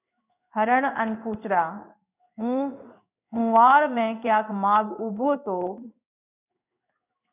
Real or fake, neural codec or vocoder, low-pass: fake; codec, 16 kHz in and 24 kHz out, 1 kbps, XY-Tokenizer; 3.6 kHz